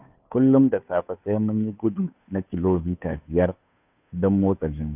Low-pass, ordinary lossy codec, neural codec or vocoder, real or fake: 3.6 kHz; none; codec, 16 kHz, 2 kbps, FunCodec, trained on Chinese and English, 25 frames a second; fake